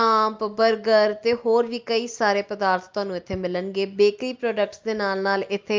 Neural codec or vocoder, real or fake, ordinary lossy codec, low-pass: none; real; Opus, 24 kbps; 7.2 kHz